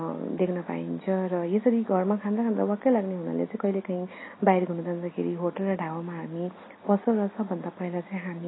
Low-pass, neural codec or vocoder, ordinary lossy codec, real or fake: 7.2 kHz; none; AAC, 16 kbps; real